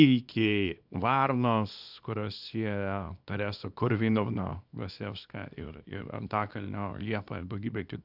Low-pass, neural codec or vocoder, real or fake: 5.4 kHz; codec, 24 kHz, 0.9 kbps, WavTokenizer, small release; fake